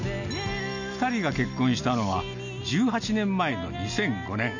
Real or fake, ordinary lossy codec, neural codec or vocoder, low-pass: real; none; none; 7.2 kHz